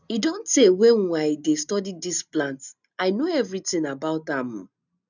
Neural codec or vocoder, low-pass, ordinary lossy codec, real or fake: none; 7.2 kHz; none; real